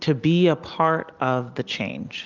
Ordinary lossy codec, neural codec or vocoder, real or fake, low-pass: Opus, 24 kbps; none; real; 7.2 kHz